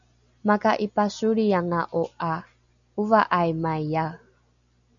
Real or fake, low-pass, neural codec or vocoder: real; 7.2 kHz; none